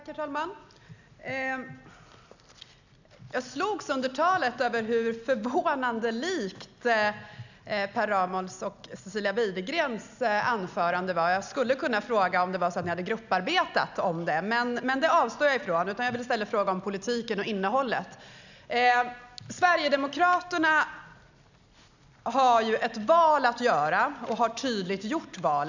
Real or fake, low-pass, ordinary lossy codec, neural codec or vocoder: real; 7.2 kHz; none; none